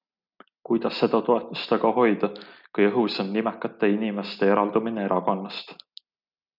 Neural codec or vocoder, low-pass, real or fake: none; 5.4 kHz; real